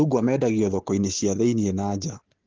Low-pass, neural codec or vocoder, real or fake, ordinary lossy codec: 7.2 kHz; none; real; Opus, 16 kbps